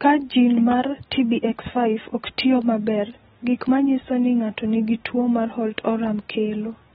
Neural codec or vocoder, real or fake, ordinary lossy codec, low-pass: none; real; AAC, 16 kbps; 19.8 kHz